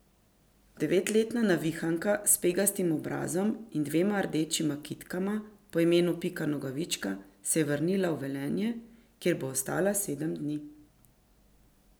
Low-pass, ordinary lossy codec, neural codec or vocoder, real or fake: none; none; none; real